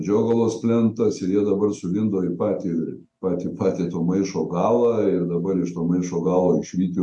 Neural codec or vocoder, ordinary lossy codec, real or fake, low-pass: none; MP3, 64 kbps; real; 10.8 kHz